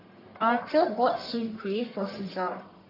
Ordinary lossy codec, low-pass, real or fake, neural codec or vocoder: AAC, 24 kbps; 5.4 kHz; fake; codec, 44.1 kHz, 1.7 kbps, Pupu-Codec